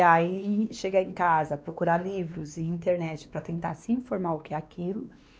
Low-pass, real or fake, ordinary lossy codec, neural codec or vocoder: none; fake; none; codec, 16 kHz, 2 kbps, X-Codec, WavLM features, trained on Multilingual LibriSpeech